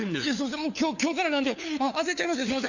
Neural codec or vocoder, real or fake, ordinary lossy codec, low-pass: codec, 16 kHz, 4 kbps, FunCodec, trained on LibriTTS, 50 frames a second; fake; none; 7.2 kHz